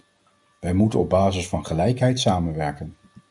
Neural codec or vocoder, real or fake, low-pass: none; real; 10.8 kHz